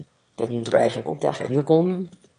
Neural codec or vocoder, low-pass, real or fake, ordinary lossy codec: autoencoder, 22.05 kHz, a latent of 192 numbers a frame, VITS, trained on one speaker; 9.9 kHz; fake; MP3, 64 kbps